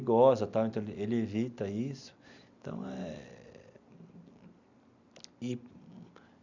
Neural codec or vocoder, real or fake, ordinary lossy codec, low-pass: none; real; none; 7.2 kHz